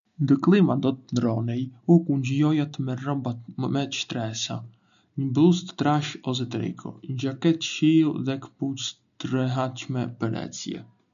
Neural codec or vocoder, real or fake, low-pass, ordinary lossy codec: none; real; 7.2 kHz; none